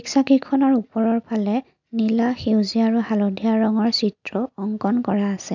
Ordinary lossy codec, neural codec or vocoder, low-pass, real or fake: none; none; 7.2 kHz; real